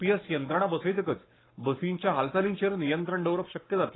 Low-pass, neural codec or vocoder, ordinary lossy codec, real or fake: 7.2 kHz; codec, 44.1 kHz, 7.8 kbps, Pupu-Codec; AAC, 16 kbps; fake